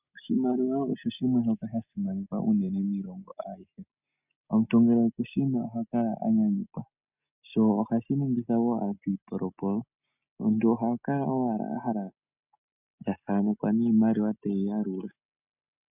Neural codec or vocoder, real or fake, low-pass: none; real; 3.6 kHz